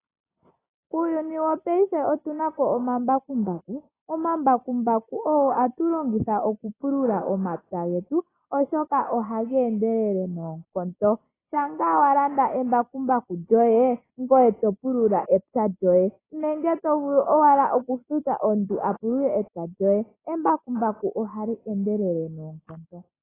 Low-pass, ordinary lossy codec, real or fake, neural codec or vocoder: 3.6 kHz; AAC, 16 kbps; real; none